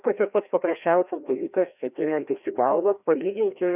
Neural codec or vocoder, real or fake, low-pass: codec, 16 kHz, 1 kbps, FreqCodec, larger model; fake; 3.6 kHz